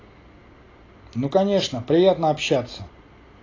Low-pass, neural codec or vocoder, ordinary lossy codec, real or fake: 7.2 kHz; none; AAC, 32 kbps; real